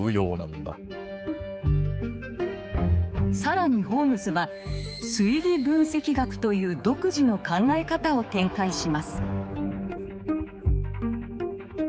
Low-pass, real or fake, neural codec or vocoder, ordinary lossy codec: none; fake; codec, 16 kHz, 4 kbps, X-Codec, HuBERT features, trained on general audio; none